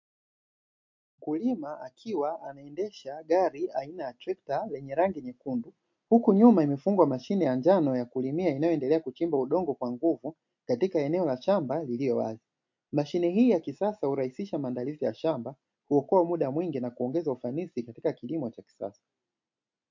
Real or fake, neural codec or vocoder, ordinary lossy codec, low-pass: real; none; MP3, 48 kbps; 7.2 kHz